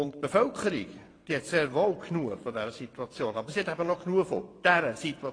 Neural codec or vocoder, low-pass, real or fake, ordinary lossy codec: vocoder, 22.05 kHz, 80 mel bands, WaveNeXt; 9.9 kHz; fake; AAC, 32 kbps